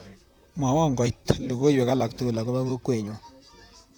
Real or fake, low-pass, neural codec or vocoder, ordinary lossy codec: fake; none; vocoder, 44.1 kHz, 128 mel bands, Pupu-Vocoder; none